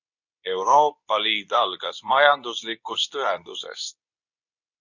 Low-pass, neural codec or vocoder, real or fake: 7.2 kHz; codec, 24 kHz, 0.9 kbps, WavTokenizer, medium speech release version 2; fake